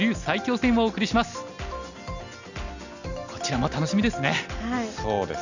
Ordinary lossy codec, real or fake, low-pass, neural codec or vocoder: none; real; 7.2 kHz; none